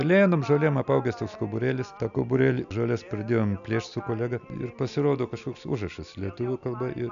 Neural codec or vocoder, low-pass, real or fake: none; 7.2 kHz; real